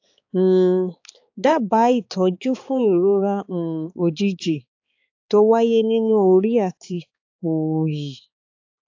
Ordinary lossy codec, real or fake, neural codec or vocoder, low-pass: AAC, 48 kbps; fake; codec, 16 kHz, 4 kbps, X-Codec, HuBERT features, trained on balanced general audio; 7.2 kHz